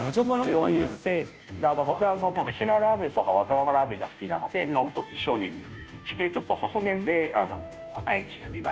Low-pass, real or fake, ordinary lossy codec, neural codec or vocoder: none; fake; none; codec, 16 kHz, 0.5 kbps, FunCodec, trained on Chinese and English, 25 frames a second